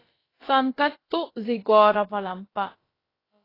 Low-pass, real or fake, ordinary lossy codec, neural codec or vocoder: 5.4 kHz; fake; AAC, 24 kbps; codec, 16 kHz, about 1 kbps, DyCAST, with the encoder's durations